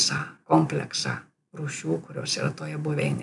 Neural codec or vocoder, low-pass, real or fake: vocoder, 44.1 kHz, 128 mel bands every 256 samples, BigVGAN v2; 10.8 kHz; fake